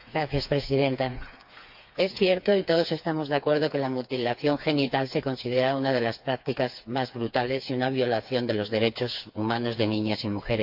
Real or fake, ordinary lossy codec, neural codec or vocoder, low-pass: fake; AAC, 48 kbps; codec, 16 kHz, 4 kbps, FreqCodec, smaller model; 5.4 kHz